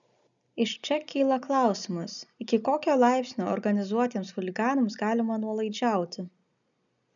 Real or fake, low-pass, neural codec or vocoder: real; 7.2 kHz; none